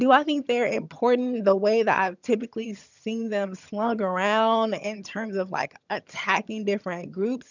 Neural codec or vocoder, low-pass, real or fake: vocoder, 22.05 kHz, 80 mel bands, HiFi-GAN; 7.2 kHz; fake